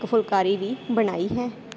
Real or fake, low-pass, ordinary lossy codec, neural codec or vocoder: real; none; none; none